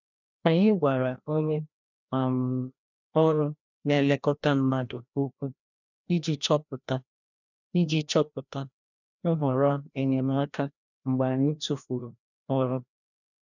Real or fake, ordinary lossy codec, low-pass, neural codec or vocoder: fake; none; 7.2 kHz; codec, 16 kHz, 1 kbps, FreqCodec, larger model